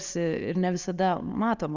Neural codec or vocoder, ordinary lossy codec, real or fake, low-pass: none; Opus, 64 kbps; real; 7.2 kHz